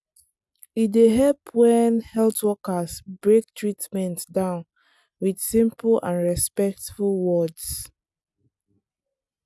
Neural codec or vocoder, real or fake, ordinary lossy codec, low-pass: none; real; none; none